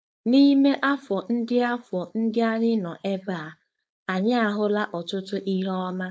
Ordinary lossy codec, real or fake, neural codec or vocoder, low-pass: none; fake; codec, 16 kHz, 4.8 kbps, FACodec; none